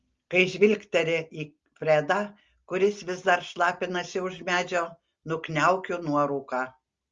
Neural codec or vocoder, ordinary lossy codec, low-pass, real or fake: none; Opus, 32 kbps; 7.2 kHz; real